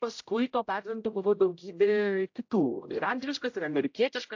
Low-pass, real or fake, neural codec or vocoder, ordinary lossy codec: 7.2 kHz; fake; codec, 16 kHz, 0.5 kbps, X-Codec, HuBERT features, trained on general audio; AAC, 48 kbps